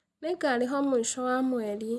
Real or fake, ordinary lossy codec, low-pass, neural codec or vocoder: real; none; none; none